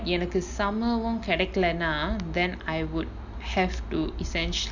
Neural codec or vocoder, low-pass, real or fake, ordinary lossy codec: none; 7.2 kHz; real; none